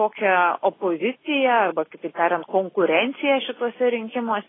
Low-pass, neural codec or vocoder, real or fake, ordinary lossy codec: 7.2 kHz; none; real; AAC, 16 kbps